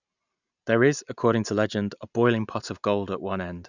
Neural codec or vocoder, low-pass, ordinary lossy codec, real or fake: none; 7.2 kHz; none; real